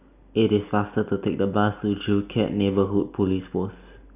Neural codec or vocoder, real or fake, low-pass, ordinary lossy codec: none; real; 3.6 kHz; none